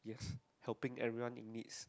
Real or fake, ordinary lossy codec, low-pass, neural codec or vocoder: real; none; none; none